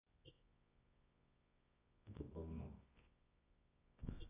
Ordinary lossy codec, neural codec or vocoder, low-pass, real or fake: AAC, 16 kbps; codec, 24 kHz, 0.9 kbps, WavTokenizer, medium music audio release; 3.6 kHz; fake